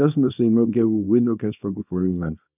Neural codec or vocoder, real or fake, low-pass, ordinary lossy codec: codec, 24 kHz, 0.9 kbps, WavTokenizer, small release; fake; 3.6 kHz; none